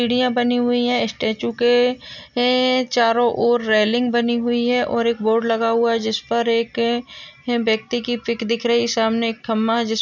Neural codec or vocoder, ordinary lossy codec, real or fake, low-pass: none; none; real; 7.2 kHz